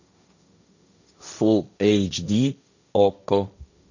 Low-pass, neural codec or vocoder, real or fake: 7.2 kHz; codec, 16 kHz, 1.1 kbps, Voila-Tokenizer; fake